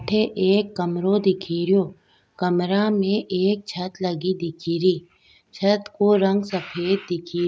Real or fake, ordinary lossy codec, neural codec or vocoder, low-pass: real; none; none; none